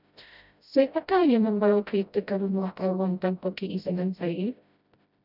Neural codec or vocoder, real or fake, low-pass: codec, 16 kHz, 0.5 kbps, FreqCodec, smaller model; fake; 5.4 kHz